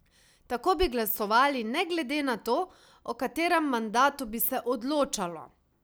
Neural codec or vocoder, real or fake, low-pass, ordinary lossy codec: none; real; none; none